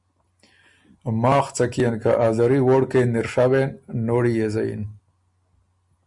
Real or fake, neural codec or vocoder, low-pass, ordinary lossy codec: real; none; 10.8 kHz; Opus, 64 kbps